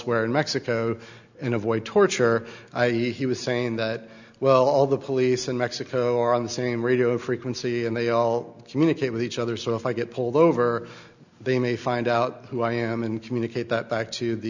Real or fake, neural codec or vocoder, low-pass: real; none; 7.2 kHz